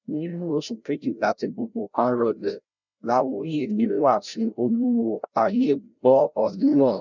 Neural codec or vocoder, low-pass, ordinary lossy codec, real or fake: codec, 16 kHz, 0.5 kbps, FreqCodec, larger model; 7.2 kHz; none; fake